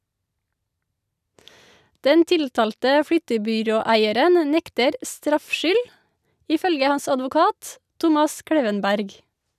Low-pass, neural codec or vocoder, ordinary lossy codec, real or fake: 14.4 kHz; none; none; real